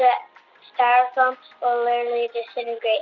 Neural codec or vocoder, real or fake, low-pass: none; real; 7.2 kHz